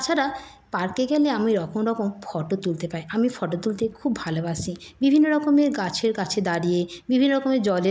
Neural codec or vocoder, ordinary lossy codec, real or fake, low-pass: none; none; real; none